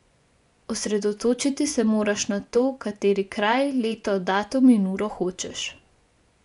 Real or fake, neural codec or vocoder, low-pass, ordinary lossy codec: fake; vocoder, 24 kHz, 100 mel bands, Vocos; 10.8 kHz; none